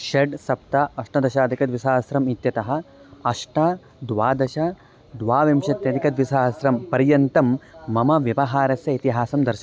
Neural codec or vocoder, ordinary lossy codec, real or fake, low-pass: none; none; real; none